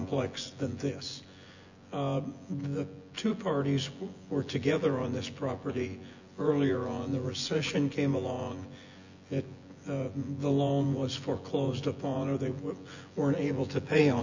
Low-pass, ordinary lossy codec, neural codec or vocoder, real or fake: 7.2 kHz; Opus, 64 kbps; vocoder, 24 kHz, 100 mel bands, Vocos; fake